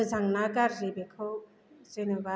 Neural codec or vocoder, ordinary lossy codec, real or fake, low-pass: none; none; real; none